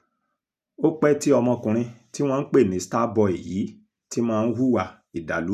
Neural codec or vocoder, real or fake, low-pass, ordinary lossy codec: none; real; 14.4 kHz; none